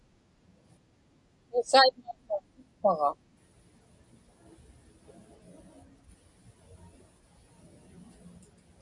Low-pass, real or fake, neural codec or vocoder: 10.8 kHz; real; none